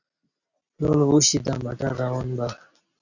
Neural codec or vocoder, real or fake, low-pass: none; real; 7.2 kHz